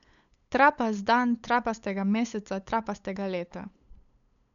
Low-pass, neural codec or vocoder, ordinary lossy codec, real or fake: 7.2 kHz; codec, 16 kHz, 8 kbps, FunCodec, trained on LibriTTS, 25 frames a second; none; fake